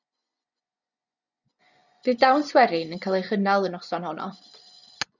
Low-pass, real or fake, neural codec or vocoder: 7.2 kHz; fake; vocoder, 24 kHz, 100 mel bands, Vocos